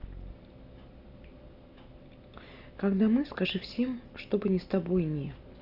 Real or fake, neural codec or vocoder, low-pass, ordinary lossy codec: real; none; 5.4 kHz; none